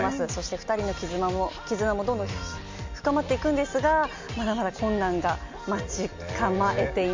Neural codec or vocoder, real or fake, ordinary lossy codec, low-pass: none; real; MP3, 48 kbps; 7.2 kHz